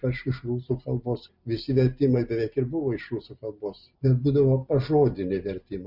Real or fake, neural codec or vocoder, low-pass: real; none; 5.4 kHz